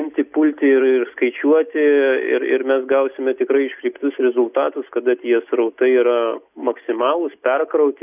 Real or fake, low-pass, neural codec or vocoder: real; 3.6 kHz; none